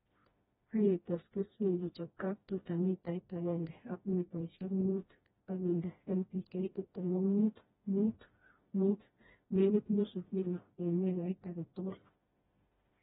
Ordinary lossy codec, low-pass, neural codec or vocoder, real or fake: AAC, 16 kbps; 7.2 kHz; codec, 16 kHz, 1 kbps, FreqCodec, smaller model; fake